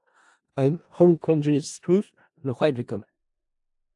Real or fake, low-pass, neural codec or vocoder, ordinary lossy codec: fake; 10.8 kHz; codec, 16 kHz in and 24 kHz out, 0.4 kbps, LongCat-Audio-Codec, four codebook decoder; AAC, 64 kbps